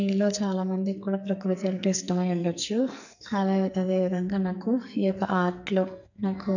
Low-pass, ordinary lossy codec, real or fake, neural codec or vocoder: 7.2 kHz; none; fake; codec, 44.1 kHz, 2.6 kbps, SNAC